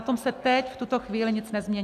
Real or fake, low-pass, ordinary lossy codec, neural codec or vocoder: real; 14.4 kHz; AAC, 96 kbps; none